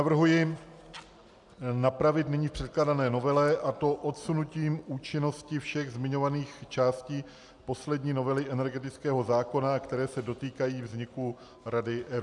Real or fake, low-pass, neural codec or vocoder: real; 10.8 kHz; none